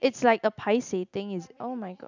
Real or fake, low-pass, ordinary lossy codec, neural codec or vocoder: real; 7.2 kHz; none; none